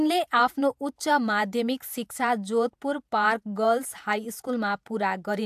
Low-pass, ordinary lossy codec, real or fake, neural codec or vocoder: 14.4 kHz; AAC, 96 kbps; fake; vocoder, 48 kHz, 128 mel bands, Vocos